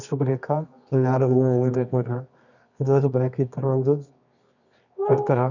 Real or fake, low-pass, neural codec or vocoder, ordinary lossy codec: fake; 7.2 kHz; codec, 24 kHz, 0.9 kbps, WavTokenizer, medium music audio release; none